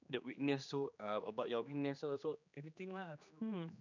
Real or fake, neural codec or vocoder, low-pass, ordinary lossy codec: fake; codec, 16 kHz, 2 kbps, X-Codec, HuBERT features, trained on general audio; 7.2 kHz; none